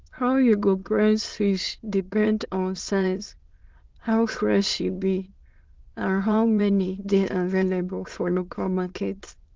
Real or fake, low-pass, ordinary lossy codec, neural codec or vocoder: fake; 7.2 kHz; Opus, 16 kbps; autoencoder, 22.05 kHz, a latent of 192 numbers a frame, VITS, trained on many speakers